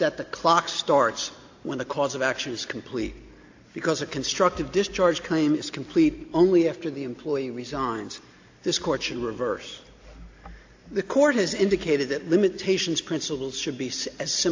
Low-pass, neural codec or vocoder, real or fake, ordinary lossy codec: 7.2 kHz; none; real; AAC, 48 kbps